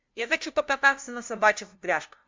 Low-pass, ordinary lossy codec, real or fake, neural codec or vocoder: 7.2 kHz; MP3, 64 kbps; fake; codec, 16 kHz, 0.5 kbps, FunCodec, trained on LibriTTS, 25 frames a second